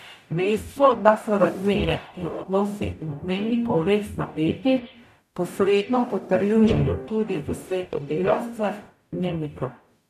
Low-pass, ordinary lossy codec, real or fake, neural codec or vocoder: 14.4 kHz; none; fake; codec, 44.1 kHz, 0.9 kbps, DAC